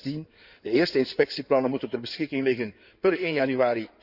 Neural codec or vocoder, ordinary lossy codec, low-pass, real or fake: codec, 16 kHz in and 24 kHz out, 2.2 kbps, FireRedTTS-2 codec; none; 5.4 kHz; fake